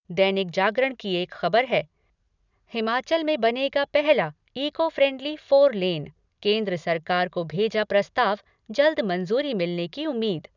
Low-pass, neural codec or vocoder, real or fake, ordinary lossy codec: 7.2 kHz; none; real; none